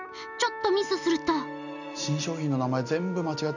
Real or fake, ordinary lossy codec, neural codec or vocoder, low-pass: real; none; none; 7.2 kHz